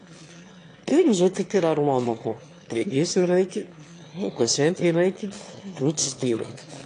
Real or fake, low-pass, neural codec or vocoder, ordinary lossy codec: fake; 9.9 kHz; autoencoder, 22.05 kHz, a latent of 192 numbers a frame, VITS, trained on one speaker; AAC, 48 kbps